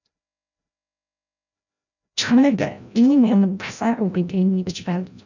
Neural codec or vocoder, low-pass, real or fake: codec, 16 kHz, 0.5 kbps, FreqCodec, larger model; 7.2 kHz; fake